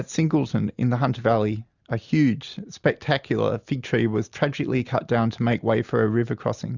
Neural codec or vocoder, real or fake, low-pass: none; real; 7.2 kHz